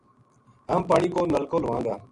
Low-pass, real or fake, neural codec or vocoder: 10.8 kHz; real; none